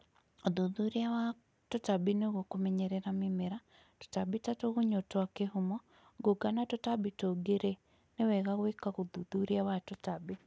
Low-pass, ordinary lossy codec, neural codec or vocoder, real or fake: none; none; none; real